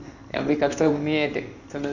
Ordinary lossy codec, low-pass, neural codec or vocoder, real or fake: none; 7.2 kHz; codec, 16 kHz in and 24 kHz out, 1 kbps, XY-Tokenizer; fake